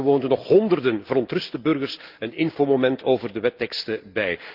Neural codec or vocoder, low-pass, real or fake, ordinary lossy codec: none; 5.4 kHz; real; Opus, 32 kbps